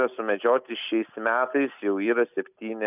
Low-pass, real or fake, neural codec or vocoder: 3.6 kHz; real; none